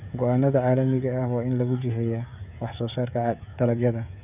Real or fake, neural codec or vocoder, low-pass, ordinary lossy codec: fake; codec, 16 kHz, 16 kbps, FreqCodec, smaller model; 3.6 kHz; none